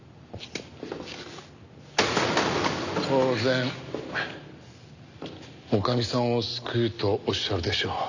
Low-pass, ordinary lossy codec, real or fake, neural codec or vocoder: 7.2 kHz; none; real; none